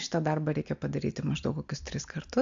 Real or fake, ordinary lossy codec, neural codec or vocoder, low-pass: real; AAC, 64 kbps; none; 7.2 kHz